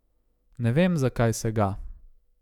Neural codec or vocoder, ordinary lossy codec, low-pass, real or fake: autoencoder, 48 kHz, 128 numbers a frame, DAC-VAE, trained on Japanese speech; none; 19.8 kHz; fake